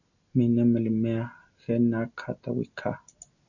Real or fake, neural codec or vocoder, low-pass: real; none; 7.2 kHz